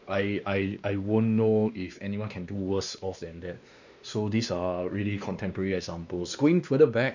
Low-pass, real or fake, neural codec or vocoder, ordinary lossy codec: 7.2 kHz; fake; codec, 16 kHz, 2 kbps, X-Codec, WavLM features, trained on Multilingual LibriSpeech; none